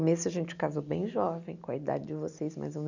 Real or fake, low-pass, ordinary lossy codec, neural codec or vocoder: real; 7.2 kHz; none; none